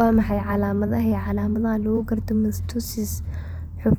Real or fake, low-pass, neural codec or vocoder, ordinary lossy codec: fake; none; vocoder, 44.1 kHz, 128 mel bands every 512 samples, BigVGAN v2; none